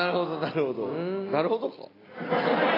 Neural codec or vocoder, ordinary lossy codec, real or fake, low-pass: none; AAC, 24 kbps; real; 5.4 kHz